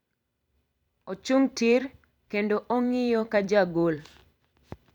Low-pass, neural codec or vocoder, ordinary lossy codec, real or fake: 19.8 kHz; vocoder, 44.1 kHz, 128 mel bands every 256 samples, BigVGAN v2; none; fake